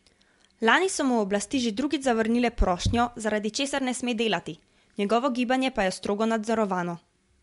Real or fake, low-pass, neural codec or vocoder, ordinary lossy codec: real; 10.8 kHz; none; MP3, 64 kbps